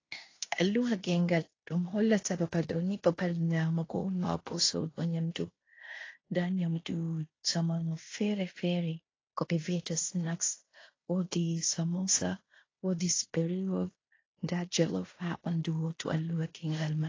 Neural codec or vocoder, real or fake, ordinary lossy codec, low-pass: codec, 16 kHz in and 24 kHz out, 0.9 kbps, LongCat-Audio-Codec, fine tuned four codebook decoder; fake; AAC, 32 kbps; 7.2 kHz